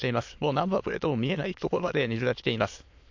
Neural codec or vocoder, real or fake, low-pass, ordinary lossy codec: autoencoder, 22.05 kHz, a latent of 192 numbers a frame, VITS, trained on many speakers; fake; 7.2 kHz; MP3, 48 kbps